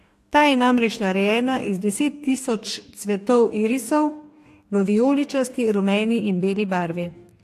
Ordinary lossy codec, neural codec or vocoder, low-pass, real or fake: AAC, 64 kbps; codec, 44.1 kHz, 2.6 kbps, DAC; 14.4 kHz; fake